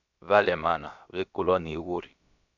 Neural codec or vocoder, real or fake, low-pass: codec, 16 kHz, about 1 kbps, DyCAST, with the encoder's durations; fake; 7.2 kHz